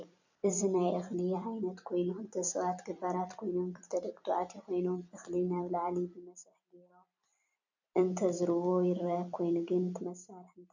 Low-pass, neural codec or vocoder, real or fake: 7.2 kHz; vocoder, 44.1 kHz, 128 mel bands every 256 samples, BigVGAN v2; fake